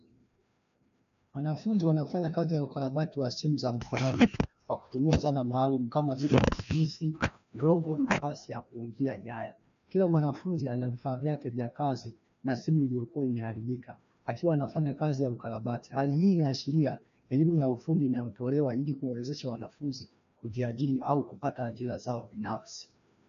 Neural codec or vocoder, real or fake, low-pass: codec, 16 kHz, 1 kbps, FreqCodec, larger model; fake; 7.2 kHz